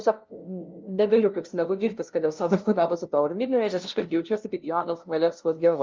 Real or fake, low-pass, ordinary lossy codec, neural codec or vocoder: fake; 7.2 kHz; Opus, 32 kbps; codec, 16 kHz, 0.5 kbps, FunCodec, trained on LibriTTS, 25 frames a second